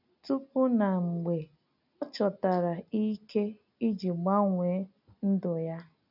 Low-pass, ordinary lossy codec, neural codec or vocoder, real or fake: 5.4 kHz; none; none; real